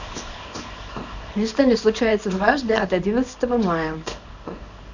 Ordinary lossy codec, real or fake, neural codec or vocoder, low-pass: none; fake; codec, 24 kHz, 0.9 kbps, WavTokenizer, small release; 7.2 kHz